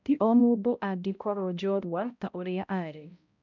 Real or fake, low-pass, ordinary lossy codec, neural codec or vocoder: fake; 7.2 kHz; none; codec, 16 kHz, 0.5 kbps, X-Codec, HuBERT features, trained on balanced general audio